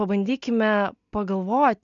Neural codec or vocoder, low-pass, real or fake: none; 7.2 kHz; real